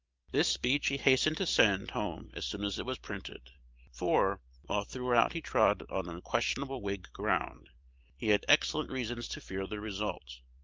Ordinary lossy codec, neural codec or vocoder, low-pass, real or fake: Opus, 24 kbps; vocoder, 44.1 kHz, 128 mel bands every 512 samples, BigVGAN v2; 7.2 kHz; fake